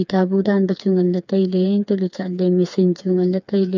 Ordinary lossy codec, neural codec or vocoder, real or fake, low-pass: none; codec, 16 kHz, 4 kbps, FreqCodec, smaller model; fake; 7.2 kHz